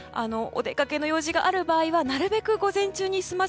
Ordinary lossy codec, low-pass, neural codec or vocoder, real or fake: none; none; none; real